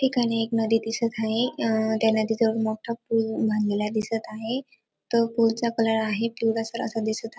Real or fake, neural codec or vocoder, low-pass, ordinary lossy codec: real; none; none; none